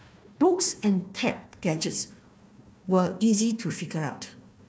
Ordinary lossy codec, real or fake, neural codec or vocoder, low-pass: none; fake; codec, 16 kHz, 1 kbps, FunCodec, trained on Chinese and English, 50 frames a second; none